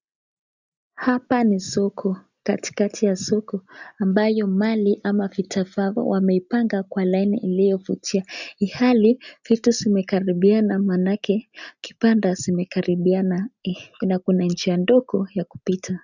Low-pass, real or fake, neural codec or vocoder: 7.2 kHz; real; none